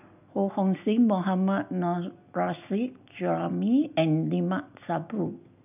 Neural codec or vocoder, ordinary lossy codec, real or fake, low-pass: none; none; real; 3.6 kHz